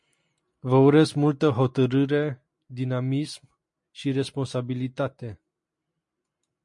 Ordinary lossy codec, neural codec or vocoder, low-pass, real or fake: MP3, 48 kbps; none; 10.8 kHz; real